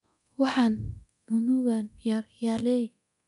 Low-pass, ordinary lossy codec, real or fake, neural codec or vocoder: 10.8 kHz; MP3, 96 kbps; fake; codec, 24 kHz, 0.9 kbps, DualCodec